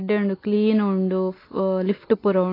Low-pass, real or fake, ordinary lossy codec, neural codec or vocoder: 5.4 kHz; real; AAC, 24 kbps; none